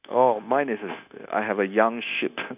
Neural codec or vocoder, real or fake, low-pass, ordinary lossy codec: codec, 16 kHz, 0.9 kbps, LongCat-Audio-Codec; fake; 3.6 kHz; none